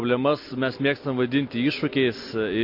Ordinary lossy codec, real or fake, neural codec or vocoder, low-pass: MP3, 32 kbps; real; none; 5.4 kHz